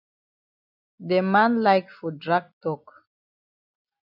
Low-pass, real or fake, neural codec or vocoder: 5.4 kHz; real; none